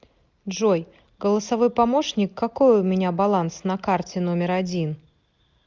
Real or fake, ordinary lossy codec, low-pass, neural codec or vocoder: real; Opus, 24 kbps; 7.2 kHz; none